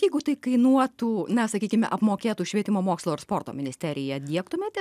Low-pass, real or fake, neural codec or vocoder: 14.4 kHz; real; none